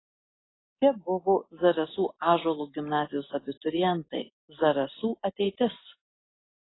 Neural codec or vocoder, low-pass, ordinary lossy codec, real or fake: none; 7.2 kHz; AAC, 16 kbps; real